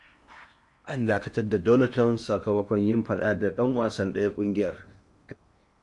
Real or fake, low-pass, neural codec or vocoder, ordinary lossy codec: fake; 10.8 kHz; codec, 16 kHz in and 24 kHz out, 0.8 kbps, FocalCodec, streaming, 65536 codes; MP3, 96 kbps